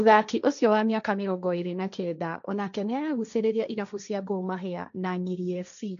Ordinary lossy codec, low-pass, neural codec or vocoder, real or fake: none; 7.2 kHz; codec, 16 kHz, 1.1 kbps, Voila-Tokenizer; fake